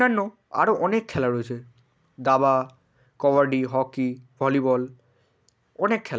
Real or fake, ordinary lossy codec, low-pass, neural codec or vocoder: real; none; none; none